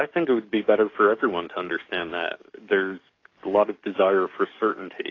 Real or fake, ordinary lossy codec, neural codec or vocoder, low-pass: fake; AAC, 32 kbps; codec, 44.1 kHz, 7.8 kbps, DAC; 7.2 kHz